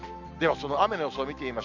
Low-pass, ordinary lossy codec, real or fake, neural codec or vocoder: 7.2 kHz; none; real; none